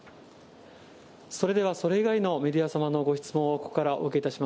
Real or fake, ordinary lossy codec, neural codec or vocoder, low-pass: real; none; none; none